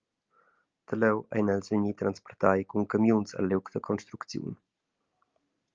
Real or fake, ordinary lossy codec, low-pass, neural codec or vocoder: real; Opus, 24 kbps; 7.2 kHz; none